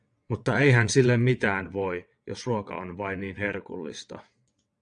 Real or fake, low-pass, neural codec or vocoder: fake; 9.9 kHz; vocoder, 22.05 kHz, 80 mel bands, WaveNeXt